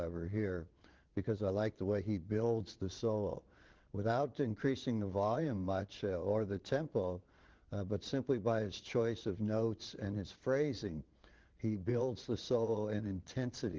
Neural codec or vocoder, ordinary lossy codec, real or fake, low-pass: vocoder, 22.05 kHz, 80 mel bands, Vocos; Opus, 16 kbps; fake; 7.2 kHz